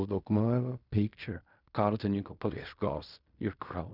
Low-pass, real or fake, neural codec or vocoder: 5.4 kHz; fake; codec, 16 kHz in and 24 kHz out, 0.4 kbps, LongCat-Audio-Codec, fine tuned four codebook decoder